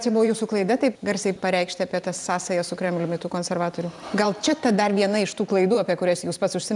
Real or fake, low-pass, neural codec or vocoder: fake; 10.8 kHz; vocoder, 44.1 kHz, 128 mel bands every 512 samples, BigVGAN v2